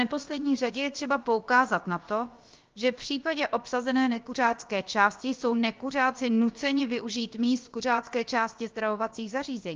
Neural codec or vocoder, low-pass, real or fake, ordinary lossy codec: codec, 16 kHz, about 1 kbps, DyCAST, with the encoder's durations; 7.2 kHz; fake; Opus, 32 kbps